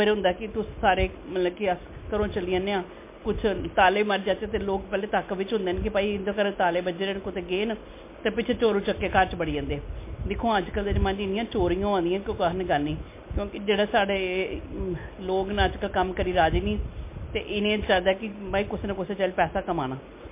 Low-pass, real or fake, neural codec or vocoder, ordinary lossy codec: 3.6 kHz; real; none; MP3, 24 kbps